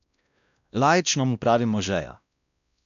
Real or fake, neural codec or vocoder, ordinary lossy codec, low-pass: fake; codec, 16 kHz, 1 kbps, X-Codec, WavLM features, trained on Multilingual LibriSpeech; none; 7.2 kHz